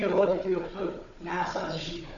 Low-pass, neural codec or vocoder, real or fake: 7.2 kHz; codec, 16 kHz, 4 kbps, FunCodec, trained on Chinese and English, 50 frames a second; fake